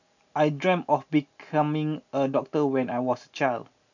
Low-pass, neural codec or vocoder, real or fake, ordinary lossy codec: 7.2 kHz; none; real; none